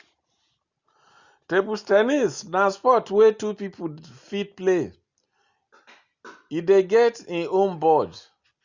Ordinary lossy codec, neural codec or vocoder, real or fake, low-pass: Opus, 64 kbps; none; real; 7.2 kHz